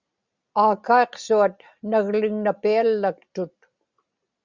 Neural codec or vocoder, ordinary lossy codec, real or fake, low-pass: none; Opus, 64 kbps; real; 7.2 kHz